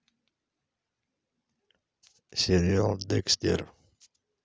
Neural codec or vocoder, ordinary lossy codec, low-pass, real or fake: none; none; none; real